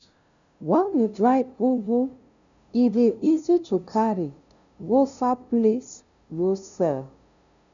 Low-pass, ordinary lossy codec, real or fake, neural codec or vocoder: 7.2 kHz; none; fake; codec, 16 kHz, 0.5 kbps, FunCodec, trained on LibriTTS, 25 frames a second